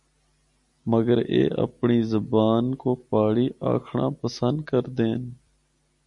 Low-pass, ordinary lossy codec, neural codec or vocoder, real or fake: 10.8 kHz; MP3, 96 kbps; none; real